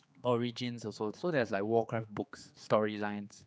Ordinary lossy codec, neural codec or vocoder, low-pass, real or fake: none; codec, 16 kHz, 4 kbps, X-Codec, HuBERT features, trained on general audio; none; fake